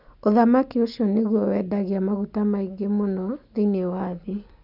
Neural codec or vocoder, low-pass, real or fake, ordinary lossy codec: none; 5.4 kHz; real; none